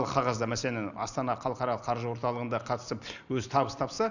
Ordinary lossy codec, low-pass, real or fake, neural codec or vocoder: none; 7.2 kHz; real; none